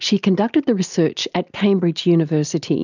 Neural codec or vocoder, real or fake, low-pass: none; real; 7.2 kHz